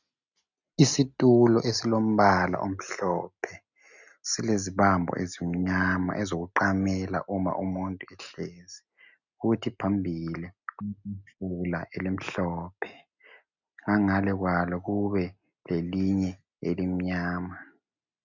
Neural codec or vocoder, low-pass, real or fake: none; 7.2 kHz; real